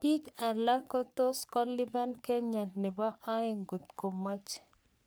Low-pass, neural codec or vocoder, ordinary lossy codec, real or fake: none; codec, 44.1 kHz, 3.4 kbps, Pupu-Codec; none; fake